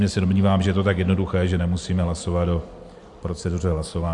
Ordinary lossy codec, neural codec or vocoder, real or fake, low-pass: AAC, 64 kbps; none; real; 10.8 kHz